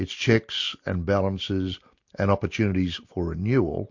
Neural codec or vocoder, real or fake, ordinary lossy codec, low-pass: none; real; MP3, 48 kbps; 7.2 kHz